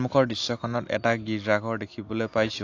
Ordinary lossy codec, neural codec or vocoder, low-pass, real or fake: AAC, 48 kbps; none; 7.2 kHz; real